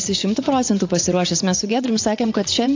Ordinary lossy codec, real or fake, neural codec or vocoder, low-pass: AAC, 48 kbps; fake; vocoder, 24 kHz, 100 mel bands, Vocos; 7.2 kHz